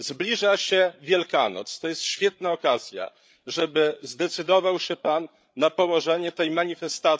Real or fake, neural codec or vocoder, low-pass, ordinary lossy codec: fake; codec, 16 kHz, 8 kbps, FreqCodec, larger model; none; none